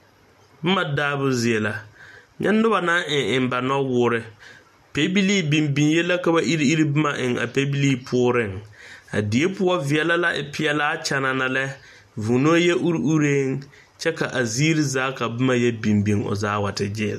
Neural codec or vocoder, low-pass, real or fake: none; 14.4 kHz; real